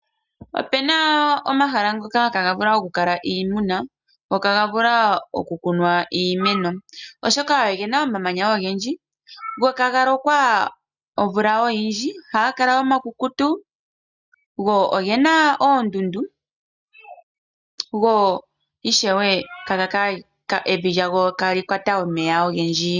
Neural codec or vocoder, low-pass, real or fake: none; 7.2 kHz; real